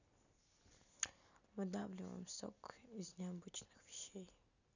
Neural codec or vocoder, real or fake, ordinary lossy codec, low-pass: none; real; none; 7.2 kHz